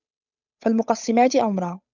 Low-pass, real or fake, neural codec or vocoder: 7.2 kHz; fake; codec, 16 kHz, 8 kbps, FunCodec, trained on Chinese and English, 25 frames a second